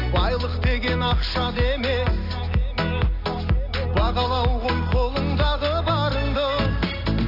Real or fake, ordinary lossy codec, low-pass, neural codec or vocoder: real; AAC, 32 kbps; 5.4 kHz; none